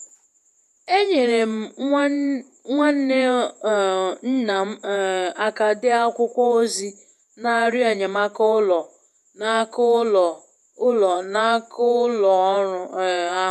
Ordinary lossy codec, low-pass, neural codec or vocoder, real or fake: none; 14.4 kHz; vocoder, 48 kHz, 128 mel bands, Vocos; fake